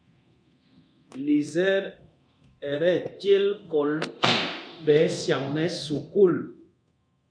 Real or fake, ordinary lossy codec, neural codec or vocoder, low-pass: fake; MP3, 64 kbps; codec, 24 kHz, 0.9 kbps, DualCodec; 9.9 kHz